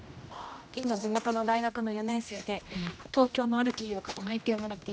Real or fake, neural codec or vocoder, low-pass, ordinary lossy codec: fake; codec, 16 kHz, 1 kbps, X-Codec, HuBERT features, trained on general audio; none; none